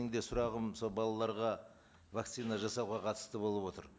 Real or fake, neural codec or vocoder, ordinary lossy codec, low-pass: real; none; none; none